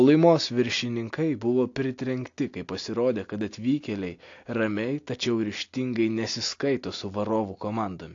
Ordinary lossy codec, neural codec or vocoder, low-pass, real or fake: AAC, 48 kbps; none; 7.2 kHz; real